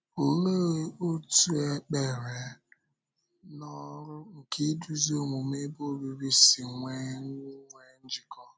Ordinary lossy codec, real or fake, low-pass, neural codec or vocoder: none; real; none; none